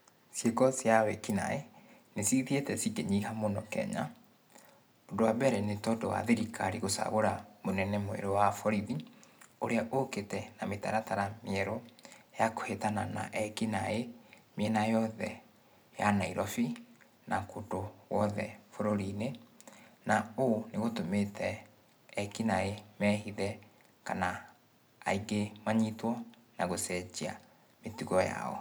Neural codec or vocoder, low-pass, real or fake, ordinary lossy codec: none; none; real; none